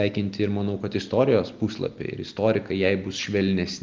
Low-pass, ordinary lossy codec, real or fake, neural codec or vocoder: 7.2 kHz; Opus, 32 kbps; real; none